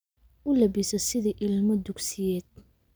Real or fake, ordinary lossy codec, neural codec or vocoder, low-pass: real; none; none; none